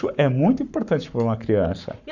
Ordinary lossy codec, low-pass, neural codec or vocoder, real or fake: none; 7.2 kHz; codec, 44.1 kHz, 7.8 kbps, Pupu-Codec; fake